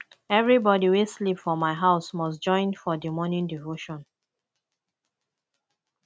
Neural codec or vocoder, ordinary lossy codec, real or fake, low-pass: none; none; real; none